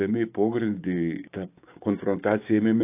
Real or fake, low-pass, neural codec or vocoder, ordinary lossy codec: fake; 3.6 kHz; codec, 16 kHz, 6 kbps, DAC; AAC, 24 kbps